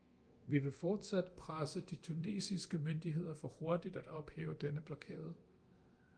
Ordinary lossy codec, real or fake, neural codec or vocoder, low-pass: Opus, 24 kbps; fake; codec, 24 kHz, 0.9 kbps, DualCodec; 9.9 kHz